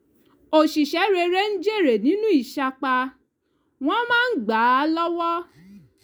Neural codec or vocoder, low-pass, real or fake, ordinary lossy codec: none; none; real; none